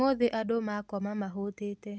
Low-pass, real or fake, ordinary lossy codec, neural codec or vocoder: none; real; none; none